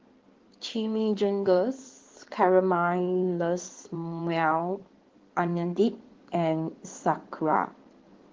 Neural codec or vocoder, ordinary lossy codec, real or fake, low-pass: codec, 16 kHz in and 24 kHz out, 2.2 kbps, FireRedTTS-2 codec; Opus, 16 kbps; fake; 7.2 kHz